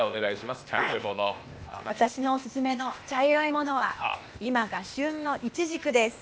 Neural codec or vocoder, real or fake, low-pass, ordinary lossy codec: codec, 16 kHz, 0.8 kbps, ZipCodec; fake; none; none